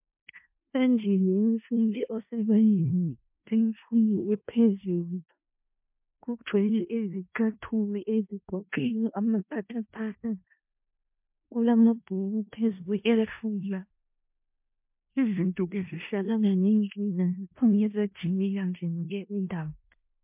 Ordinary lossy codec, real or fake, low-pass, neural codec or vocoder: MP3, 32 kbps; fake; 3.6 kHz; codec, 16 kHz in and 24 kHz out, 0.4 kbps, LongCat-Audio-Codec, four codebook decoder